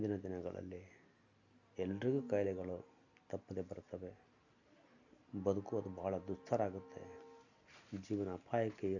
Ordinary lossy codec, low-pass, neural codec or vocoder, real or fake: none; 7.2 kHz; none; real